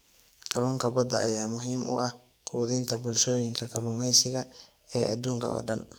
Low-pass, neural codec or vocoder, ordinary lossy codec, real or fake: none; codec, 44.1 kHz, 2.6 kbps, SNAC; none; fake